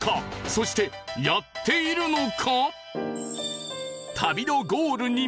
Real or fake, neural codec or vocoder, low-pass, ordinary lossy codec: real; none; none; none